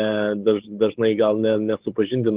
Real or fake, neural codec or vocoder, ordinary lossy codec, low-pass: fake; codec, 16 kHz, 8 kbps, FreqCodec, larger model; Opus, 16 kbps; 3.6 kHz